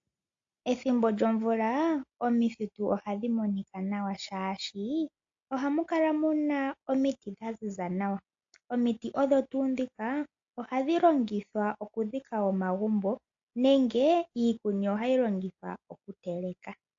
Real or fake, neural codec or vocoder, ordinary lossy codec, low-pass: real; none; AAC, 48 kbps; 7.2 kHz